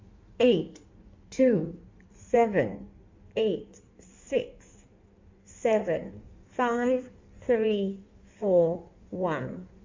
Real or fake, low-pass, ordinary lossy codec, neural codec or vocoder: fake; 7.2 kHz; MP3, 64 kbps; codec, 16 kHz in and 24 kHz out, 1.1 kbps, FireRedTTS-2 codec